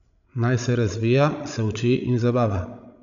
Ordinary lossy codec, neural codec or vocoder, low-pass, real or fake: none; codec, 16 kHz, 16 kbps, FreqCodec, larger model; 7.2 kHz; fake